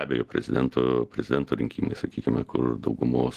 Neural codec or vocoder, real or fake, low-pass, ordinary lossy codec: none; real; 14.4 kHz; Opus, 16 kbps